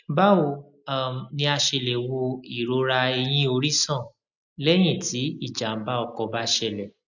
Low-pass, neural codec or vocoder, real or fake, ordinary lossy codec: 7.2 kHz; none; real; none